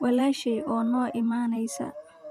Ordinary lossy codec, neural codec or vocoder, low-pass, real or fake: none; vocoder, 44.1 kHz, 128 mel bands every 512 samples, BigVGAN v2; 14.4 kHz; fake